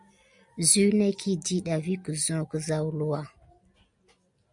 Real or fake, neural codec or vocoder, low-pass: real; none; 10.8 kHz